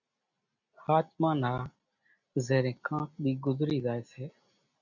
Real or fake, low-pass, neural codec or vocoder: real; 7.2 kHz; none